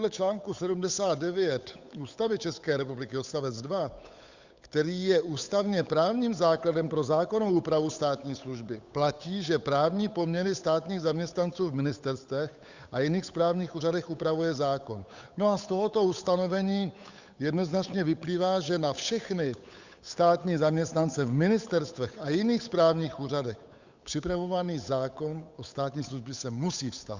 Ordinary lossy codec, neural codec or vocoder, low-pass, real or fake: Opus, 64 kbps; codec, 16 kHz, 8 kbps, FunCodec, trained on Chinese and English, 25 frames a second; 7.2 kHz; fake